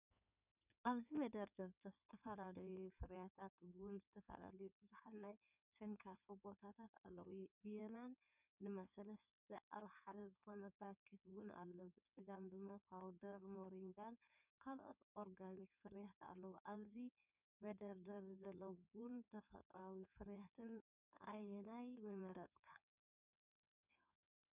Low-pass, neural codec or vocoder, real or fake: 3.6 kHz; codec, 16 kHz in and 24 kHz out, 2.2 kbps, FireRedTTS-2 codec; fake